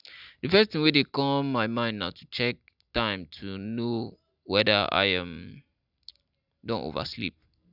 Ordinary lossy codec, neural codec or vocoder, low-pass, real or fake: none; none; 5.4 kHz; real